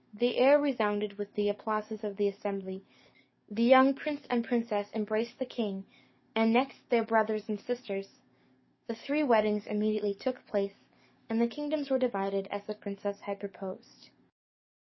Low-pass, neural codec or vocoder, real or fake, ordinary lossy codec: 7.2 kHz; codec, 44.1 kHz, 7.8 kbps, DAC; fake; MP3, 24 kbps